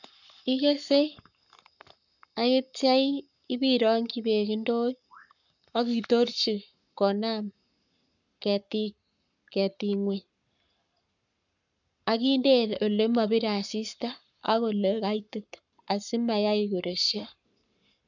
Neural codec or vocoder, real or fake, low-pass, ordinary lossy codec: codec, 44.1 kHz, 7.8 kbps, Pupu-Codec; fake; 7.2 kHz; none